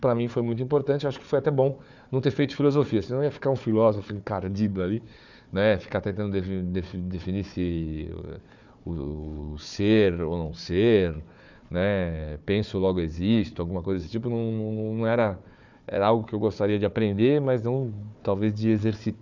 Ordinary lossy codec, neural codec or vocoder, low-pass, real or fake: none; codec, 16 kHz, 4 kbps, FunCodec, trained on Chinese and English, 50 frames a second; 7.2 kHz; fake